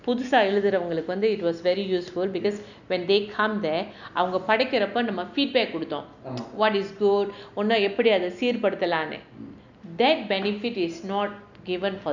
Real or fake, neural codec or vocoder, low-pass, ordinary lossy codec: real; none; 7.2 kHz; none